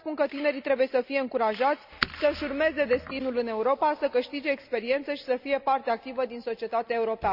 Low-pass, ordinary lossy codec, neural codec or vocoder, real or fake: 5.4 kHz; none; none; real